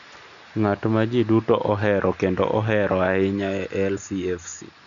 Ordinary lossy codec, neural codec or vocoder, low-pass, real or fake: none; none; 7.2 kHz; real